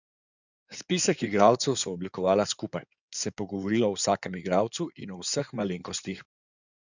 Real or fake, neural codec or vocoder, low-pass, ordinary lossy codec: fake; codec, 16 kHz in and 24 kHz out, 2.2 kbps, FireRedTTS-2 codec; 7.2 kHz; none